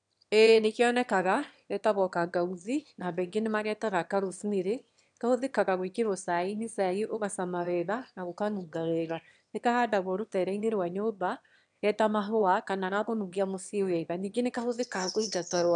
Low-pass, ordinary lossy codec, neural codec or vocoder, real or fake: 9.9 kHz; none; autoencoder, 22.05 kHz, a latent of 192 numbers a frame, VITS, trained on one speaker; fake